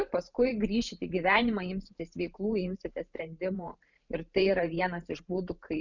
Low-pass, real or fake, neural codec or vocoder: 7.2 kHz; fake; vocoder, 44.1 kHz, 128 mel bands every 512 samples, BigVGAN v2